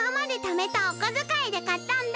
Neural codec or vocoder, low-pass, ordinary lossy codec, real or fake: none; none; none; real